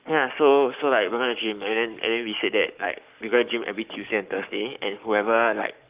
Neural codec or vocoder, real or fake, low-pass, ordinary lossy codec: codec, 44.1 kHz, 7.8 kbps, Pupu-Codec; fake; 3.6 kHz; Opus, 32 kbps